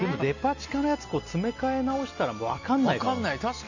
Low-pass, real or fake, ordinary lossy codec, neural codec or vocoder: 7.2 kHz; real; none; none